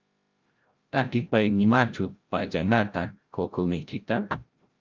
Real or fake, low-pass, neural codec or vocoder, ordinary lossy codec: fake; 7.2 kHz; codec, 16 kHz, 0.5 kbps, FreqCodec, larger model; Opus, 32 kbps